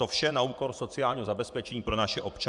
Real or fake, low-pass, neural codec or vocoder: fake; 10.8 kHz; vocoder, 24 kHz, 100 mel bands, Vocos